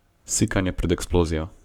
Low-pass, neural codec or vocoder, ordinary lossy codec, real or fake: 19.8 kHz; codec, 44.1 kHz, 7.8 kbps, Pupu-Codec; none; fake